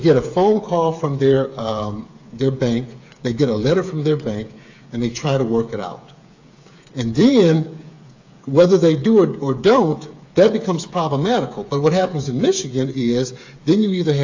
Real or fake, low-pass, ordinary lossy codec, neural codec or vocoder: fake; 7.2 kHz; AAC, 48 kbps; codec, 16 kHz, 8 kbps, FreqCodec, smaller model